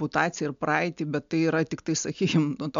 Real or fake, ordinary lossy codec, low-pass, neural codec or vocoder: real; MP3, 64 kbps; 7.2 kHz; none